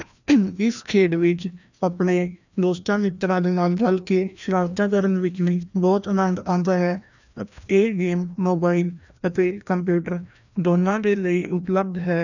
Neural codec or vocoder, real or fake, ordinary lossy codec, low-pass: codec, 16 kHz, 1 kbps, FreqCodec, larger model; fake; none; 7.2 kHz